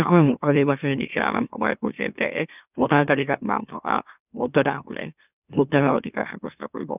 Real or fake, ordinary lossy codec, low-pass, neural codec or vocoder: fake; none; 3.6 kHz; autoencoder, 44.1 kHz, a latent of 192 numbers a frame, MeloTTS